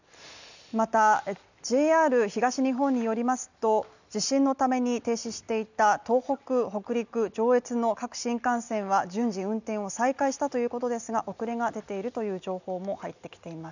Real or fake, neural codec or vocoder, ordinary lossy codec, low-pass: real; none; none; 7.2 kHz